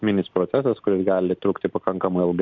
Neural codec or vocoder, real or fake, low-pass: none; real; 7.2 kHz